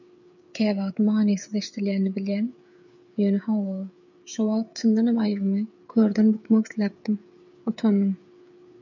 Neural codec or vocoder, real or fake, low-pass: codec, 16 kHz, 8 kbps, FreqCodec, smaller model; fake; 7.2 kHz